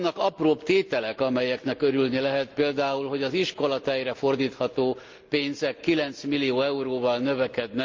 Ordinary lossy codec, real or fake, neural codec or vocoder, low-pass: Opus, 24 kbps; real; none; 7.2 kHz